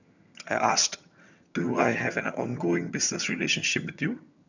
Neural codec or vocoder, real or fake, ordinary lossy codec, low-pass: vocoder, 22.05 kHz, 80 mel bands, HiFi-GAN; fake; none; 7.2 kHz